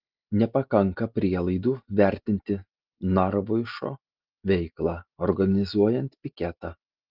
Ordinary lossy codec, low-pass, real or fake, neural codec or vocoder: Opus, 32 kbps; 5.4 kHz; real; none